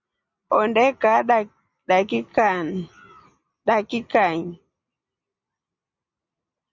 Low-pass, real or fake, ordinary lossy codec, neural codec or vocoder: 7.2 kHz; real; Opus, 64 kbps; none